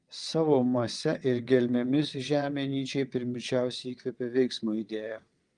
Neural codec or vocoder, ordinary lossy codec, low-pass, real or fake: vocoder, 22.05 kHz, 80 mel bands, WaveNeXt; Opus, 24 kbps; 9.9 kHz; fake